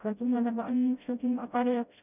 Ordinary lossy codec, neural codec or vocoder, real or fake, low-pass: none; codec, 16 kHz, 0.5 kbps, FreqCodec, smaller model; fake; 3.6 kHz